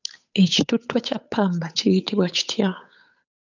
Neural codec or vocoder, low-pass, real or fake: codec, 16 kHz, 8 kbps, FunCodec, trained on Chinese and English, 25 frames a second; 7.2 kHz; fake